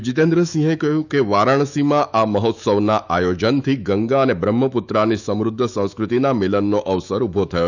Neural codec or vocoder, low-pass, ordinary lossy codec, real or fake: autoencoder, 48 kHz, 128 numbers a frame, DAC-VAE, trained on Japanese speech; 7.2 kHz; none; fake